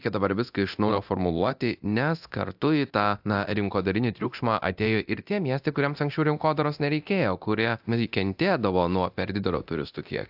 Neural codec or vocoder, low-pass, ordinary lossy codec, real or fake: codec, 24 kHz, 0.9 kbps, DualCodec; 5.4 kHz; AAC, 48 kbps; fake